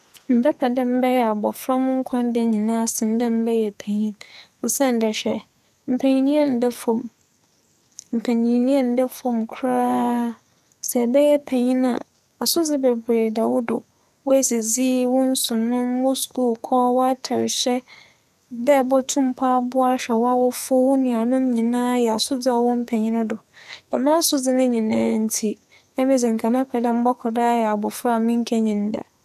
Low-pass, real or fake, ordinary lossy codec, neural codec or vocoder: 14.4 kHz; fake; none; codec, 44.1 kHz, 2.6 kbps, SNAC